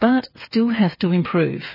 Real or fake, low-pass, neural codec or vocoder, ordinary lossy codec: fake; 5.4 kHz; codec, 16 kHz, 8 kbps, FreqCodec, smaller model; MP3, 24 kbps